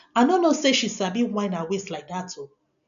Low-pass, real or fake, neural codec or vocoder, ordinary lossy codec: 7.2 kHz; real; none; none